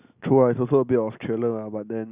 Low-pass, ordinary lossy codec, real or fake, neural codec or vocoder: 3.6 kHz; Opus, 64 kbps; real; none